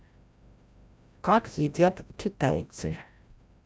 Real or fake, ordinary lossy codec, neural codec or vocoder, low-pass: fake; none; codec, 16 kHz, 0.5 kbps, FreqCodec, larger model; none